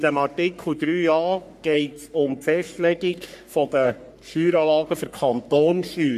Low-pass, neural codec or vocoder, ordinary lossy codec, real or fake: 14.4 kHz; codec, 44.1 kHz, 3.4 kbps, Pupu-Codec; none; fake